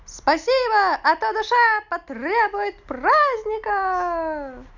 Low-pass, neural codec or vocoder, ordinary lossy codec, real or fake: 7.2 kHz; none; none; real